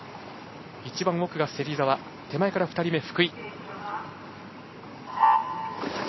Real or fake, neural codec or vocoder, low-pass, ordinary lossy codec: real; none; 7.2 kHz; MP3, 24 kbps